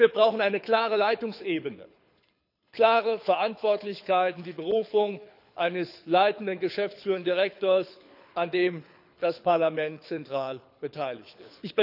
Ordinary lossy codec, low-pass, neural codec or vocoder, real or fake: none; 5.4 kHz; codec, 24 kHz, 6 kbps, HILCodec; fake